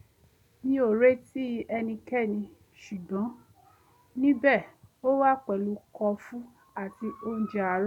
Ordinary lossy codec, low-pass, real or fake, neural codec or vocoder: none; 19.8 kHz; real; none